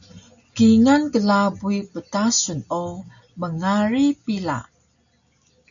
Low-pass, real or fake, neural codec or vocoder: 7.2 kHz; real; none